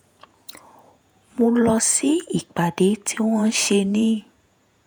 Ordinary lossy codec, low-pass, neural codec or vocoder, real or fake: none; none; vocoder, 48 kHz, 128 mel bands, Vocos; fake